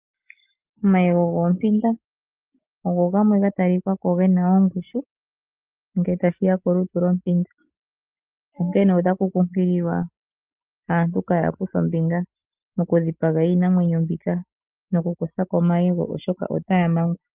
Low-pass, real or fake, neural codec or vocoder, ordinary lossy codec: 3.6 kHz; real; none; Opus, 24 kbps